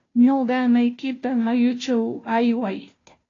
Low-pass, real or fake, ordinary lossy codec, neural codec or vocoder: 7.2 kHz; fake; AAC, 32 kbps; codec, 16 kHz, 0.5 kbps, FunCodec, trained on Chinese and English, 25 frames a second